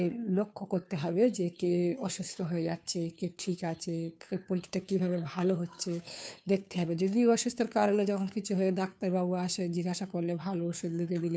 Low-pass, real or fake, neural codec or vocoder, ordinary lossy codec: none; fake; codec, 16 kHz, 2 kbps, FunCodec, trained on Chinese and English, 25 frames a second; none